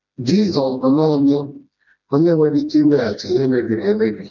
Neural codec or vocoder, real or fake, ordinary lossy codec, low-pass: codec, 16 kHz, 1 kbps, FreqCodec, smaller model; fake; none; 7.2 kHz